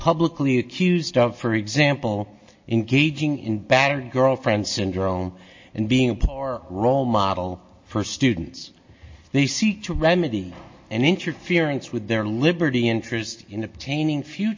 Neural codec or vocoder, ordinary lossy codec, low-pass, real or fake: none; MP3, 32 kbps; 7.2 kHz; real